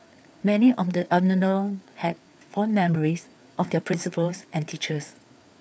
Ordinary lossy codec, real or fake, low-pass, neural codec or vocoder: none; fake; none; codec, 16 kHz, 4 kbps, FreqCodec, larger model